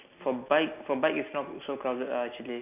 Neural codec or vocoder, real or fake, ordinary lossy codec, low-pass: none; real; none; 3.6 kHz